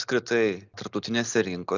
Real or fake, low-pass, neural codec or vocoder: real; 7.2 kHz; none